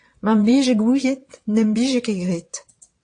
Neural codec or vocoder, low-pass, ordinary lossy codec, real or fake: vocoder, 22.05 kHz, 80 mel bands, WaveNeXt; 9.9 kHz; AAC, 48 kbps; fake